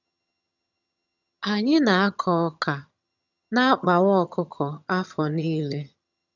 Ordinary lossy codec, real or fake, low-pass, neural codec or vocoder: none; fake; 7.2 kHz; vocoder, 22.05 kHz, 80 mel bands, HiFi-GAN